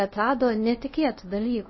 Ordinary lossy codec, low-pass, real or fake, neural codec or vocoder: MP3, 24 kbps; 7.2 kHz; fake; codec, 24 kHz, 0.5 kbps, DualCodec